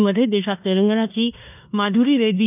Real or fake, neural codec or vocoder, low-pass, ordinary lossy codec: fake; codec, 16 kHz in and 24 kHz out, 0.9 kbps, LongCat-Audio-Codec, four codebook decoder; 3.6 kHz; none